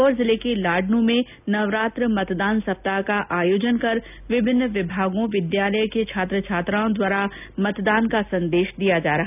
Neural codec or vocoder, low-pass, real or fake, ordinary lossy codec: none; 3.6 kHz; real; none